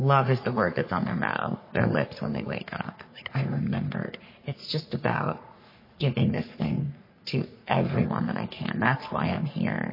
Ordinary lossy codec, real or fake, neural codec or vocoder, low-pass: MP3, 24 kbps; fake; codec, 44.1 kHz, 3.4 kbps, Pupu-Codec; 5.4 kHz